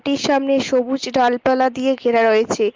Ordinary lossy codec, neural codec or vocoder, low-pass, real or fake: Opus, 32 kbps; none; 7.2 kHz; real